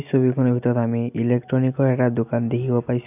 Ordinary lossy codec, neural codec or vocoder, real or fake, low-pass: none; none; real; 3.6 kHz